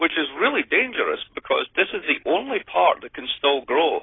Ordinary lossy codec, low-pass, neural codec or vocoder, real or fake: AAC, 16 kbps; 7.2 kHz; none; real